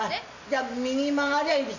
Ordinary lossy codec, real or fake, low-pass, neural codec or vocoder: none; real; 7.2 kHz; none